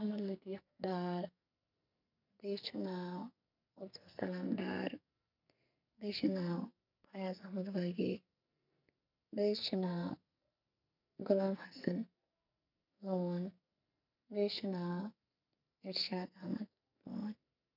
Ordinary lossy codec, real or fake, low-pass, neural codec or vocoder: none; fake; 5.4 kHz; codec, 44.1 kHz, 2.6 kbps, SNAC